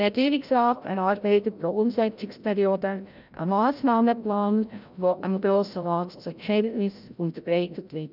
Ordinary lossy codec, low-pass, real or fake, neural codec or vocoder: none; 5.4 kHz; fake; codec, 16 kHz, 0.5 kbps, FreqCodec, larger model